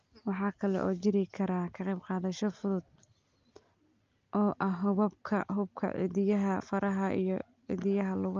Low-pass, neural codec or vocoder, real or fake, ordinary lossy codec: 7.2 kHz; none; real; Opus, 24 kbps